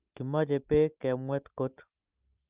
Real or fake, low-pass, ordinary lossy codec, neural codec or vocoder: real; 3.6 kHz; Opus, 24 kbps; none